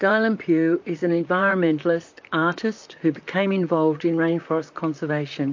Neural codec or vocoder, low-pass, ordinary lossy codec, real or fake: vocoder, 44.1 kHz, 128 mel bands, Pupu-Vocoder; 7.2 kHz; MP3, 48 kbps; fake